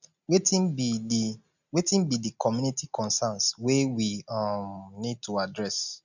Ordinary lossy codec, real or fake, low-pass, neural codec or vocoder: none; real; 7.2 kHz; none